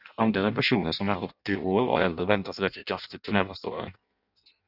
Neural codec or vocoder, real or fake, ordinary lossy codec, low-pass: codec, 16 kHz in and 24 kHz out, 0.6 kbps, FireRedTTS-2 codec; fake; Opus, 64 kbps; 5.4 kHz